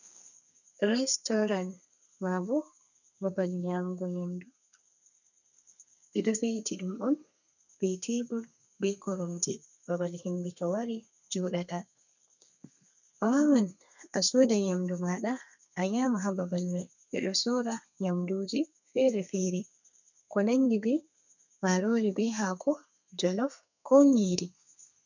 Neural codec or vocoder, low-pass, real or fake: codec, 32 kHz, 1.9 kbps, SNAC; 7.2 kHz; fake